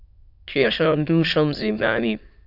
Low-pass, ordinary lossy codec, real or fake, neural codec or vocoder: 5.4 kHz; AAC, 48 kbps; fake; autoencoder, 22.05 kHz, a latent of 192 numbers a frame, VITS, trained on many speakers